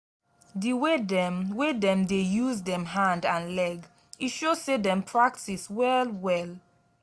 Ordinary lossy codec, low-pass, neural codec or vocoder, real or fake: none; none; none; real